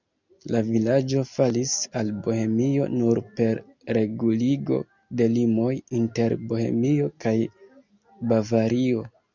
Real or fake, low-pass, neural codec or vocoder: real; 7.2 kHz; none